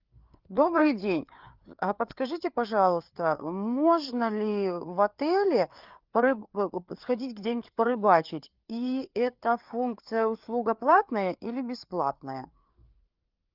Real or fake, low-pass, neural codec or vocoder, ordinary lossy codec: fake; 5.4 kHz; codec, 16 kHz, 4 kbps, FreqCodec, larger model; Opus, 32 kbps